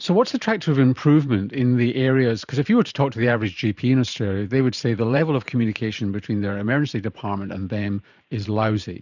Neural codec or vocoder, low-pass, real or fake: vocoder, 44.1 kHz, 128 mel bands every 512 samples, BigVGAN v2; 7.2 kHz; fake